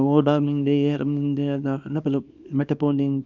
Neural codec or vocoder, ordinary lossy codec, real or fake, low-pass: codec, 24 kHz, 0.9 kbps, WavTokenizer, small release; none; fake; 7.2 kHz